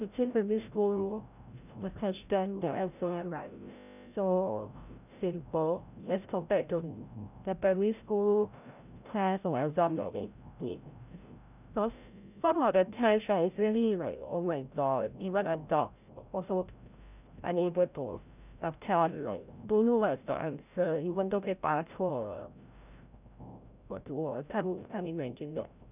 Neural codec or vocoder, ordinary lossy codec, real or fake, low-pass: codec, 16 kHz, 0.5 kbps, FreqCodec, larger model; none; fake; 3.6 kHz